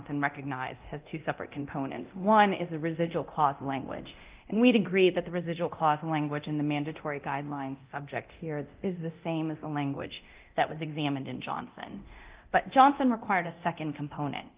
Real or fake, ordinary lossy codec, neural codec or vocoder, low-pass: fake; Opus, 32 kbps; codec, 24 kHz, 0.9 kbps, DualCodec; 3.6 kHz